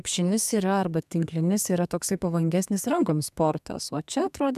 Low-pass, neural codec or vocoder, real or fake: 14.4 kHz; codec, 32 kHz, 1.9 kbps, SNAC; fake